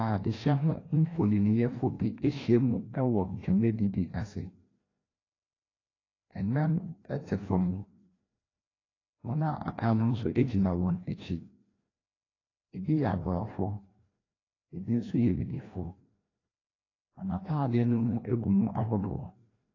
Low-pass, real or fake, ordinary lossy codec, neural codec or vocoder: 7.2 kHz; fake; AAC, 32 kbps; codec, 16 kHz, 1 kbps, FreqCodec, larger model